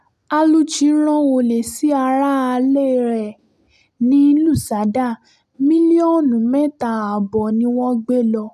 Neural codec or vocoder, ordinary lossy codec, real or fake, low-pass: none; none; real; 14.4 kHz